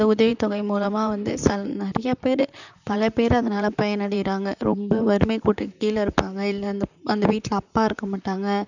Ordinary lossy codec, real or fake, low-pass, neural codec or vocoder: none; fake; 7.2 kHz; vocoder, 44.1 kHz, 128 mel bands, Pupu-Vocoder